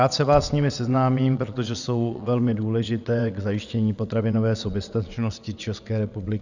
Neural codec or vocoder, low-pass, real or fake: vocoder, 22.05 kHz, 80 mel bands, WaveNeXt; 7.2 kHz; fake